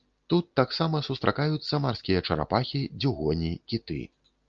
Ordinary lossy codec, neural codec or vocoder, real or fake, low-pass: Opus, 24 kbps; none; real; 7.2 kHz